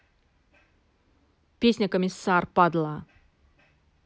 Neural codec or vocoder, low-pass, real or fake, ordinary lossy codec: none; none; real; none